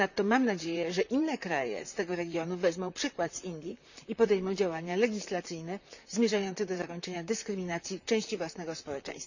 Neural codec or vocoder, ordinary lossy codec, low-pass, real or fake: vocoder, 44.1 kHz, 128 mel bands, Pupu-Vocoder; none; 7.2 kHz; fake